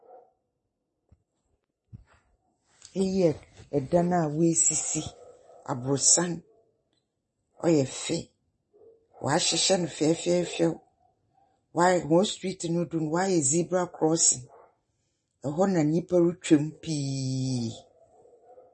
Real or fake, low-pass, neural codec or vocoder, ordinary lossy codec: real; 9.9 kHz; none; MP3, 32 kbps